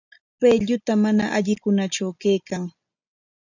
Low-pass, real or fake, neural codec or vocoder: 7.2 kHz; real; none